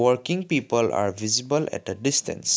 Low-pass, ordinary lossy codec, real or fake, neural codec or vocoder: none; none; real; none